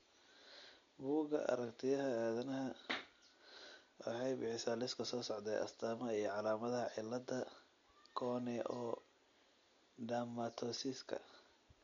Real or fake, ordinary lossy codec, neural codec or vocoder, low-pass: real; MP3, 48 kbps; none; 7.2 kHz